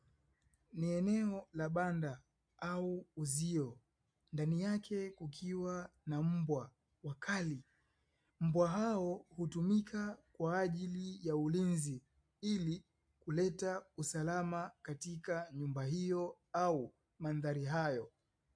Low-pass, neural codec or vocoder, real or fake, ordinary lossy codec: 9.9 kHz; none; real; MP3, 64 kbps